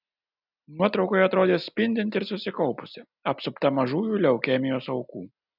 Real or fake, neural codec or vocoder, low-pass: real; none; 5.4 kHz